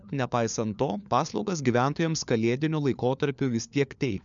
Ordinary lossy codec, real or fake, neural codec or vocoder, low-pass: MP3, 96 kbps; fake; codec, 16 kHz, 4 kbps, FunCodec, trained on LibriTTS, 50 frames a second; 7.2 kHz